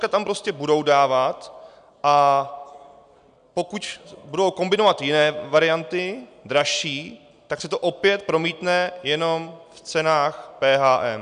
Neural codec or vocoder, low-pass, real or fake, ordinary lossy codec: none; 9.9 kHz; real; MP3, 96 kbps